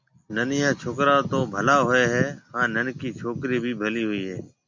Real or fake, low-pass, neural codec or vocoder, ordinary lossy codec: real; 7.2 kHz; none; MP3, 48 kbps